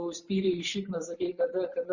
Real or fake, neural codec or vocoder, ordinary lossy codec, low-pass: fake; vocoder, 44.1 kHz, 128 mel bands every 256 samples, BigVGAN v2; Opus, 64 kbps; 7.2 kHz